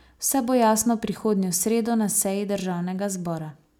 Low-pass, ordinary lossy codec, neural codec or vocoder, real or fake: none; none; none; real